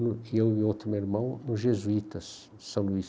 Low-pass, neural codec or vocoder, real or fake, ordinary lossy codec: none; none; real; none